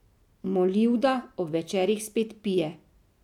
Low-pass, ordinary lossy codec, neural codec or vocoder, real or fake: 19.8 kHz; none; none; real